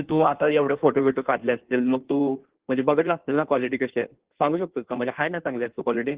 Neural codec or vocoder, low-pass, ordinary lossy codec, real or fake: codec, 16 kHz in and 24 kHz out, 1.1 kbps, FireRedTTS-2 codec; 3.6 kHz; Opus, 16 kbps; fake